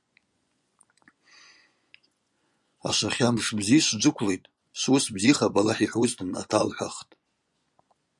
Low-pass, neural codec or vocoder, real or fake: 10.8 kHz; vocoder, 24 kHz, 100 mel bands, Vocos; fake